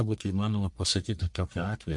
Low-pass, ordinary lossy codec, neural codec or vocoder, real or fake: 10.8 kHz; MP3, 64 kbps; codec, 44.1 kHz, 1.7 kbps, Pupu-Codec; fake